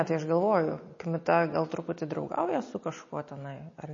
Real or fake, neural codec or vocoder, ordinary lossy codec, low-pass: real; none; MP3, 32 kbps; 7.2 kHz